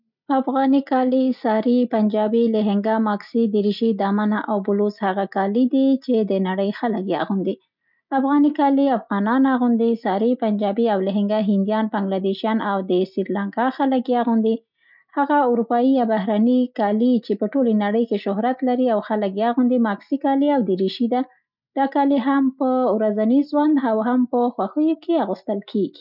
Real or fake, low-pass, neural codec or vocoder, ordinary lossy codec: real; 5.4 kHz; none; none